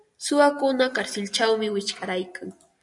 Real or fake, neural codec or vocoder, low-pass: real; none; 10.8 kHz